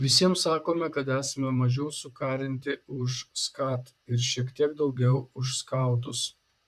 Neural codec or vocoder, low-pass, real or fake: vocoder, 44.1 kHz, 128 mel bands, Pupu-Vocoder; 14.4 kHz; fake